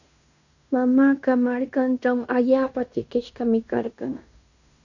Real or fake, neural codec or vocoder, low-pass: fake; codec, 16 kHz in and 24 kHz out, 0.9 kbps, LongCat-Audio-Codec, fine tuned four codebook decoder; 7.2 kHz